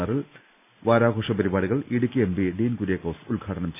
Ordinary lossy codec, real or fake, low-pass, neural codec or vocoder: none; real; 3.6 kHz; none